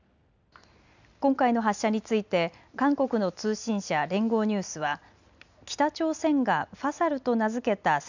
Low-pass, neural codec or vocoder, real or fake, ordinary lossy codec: 7.2 kHz; none; real; none